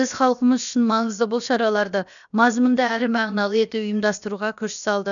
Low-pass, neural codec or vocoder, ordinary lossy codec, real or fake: 7.2 kHz; codec, 16 kHz, about 1 kbps, DyCAST, with the encoder's durations; none; fake